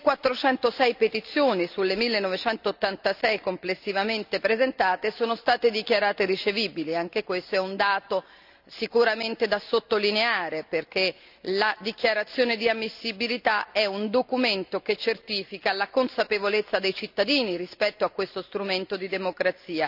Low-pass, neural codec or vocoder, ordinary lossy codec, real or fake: 5.4 kHz; none; none; real